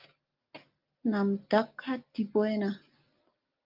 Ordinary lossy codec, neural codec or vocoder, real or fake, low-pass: Opus, 24 kbps; none; real; 5.4 kHz